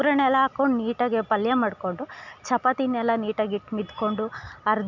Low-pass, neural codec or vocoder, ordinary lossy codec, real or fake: 7.2 kHz; none; none; real